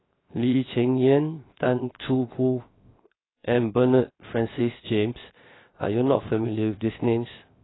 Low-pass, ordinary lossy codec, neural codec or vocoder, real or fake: 7.2 kHz; AAC, 16 kbps; codec, 16 kHz, 0.7 kbps, FocalCodec; fake